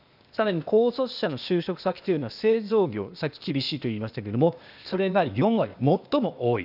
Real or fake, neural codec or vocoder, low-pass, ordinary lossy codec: fake; codec, 16 kHz, 0.8 kbps, ZipCodec; 5.4 kHz; none